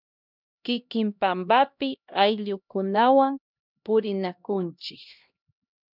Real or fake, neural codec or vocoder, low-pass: fake; codec, 16 kHz, 1 kbps, X-Codec, HuBERT features, trained on LibriSpeech; 5.4 kHz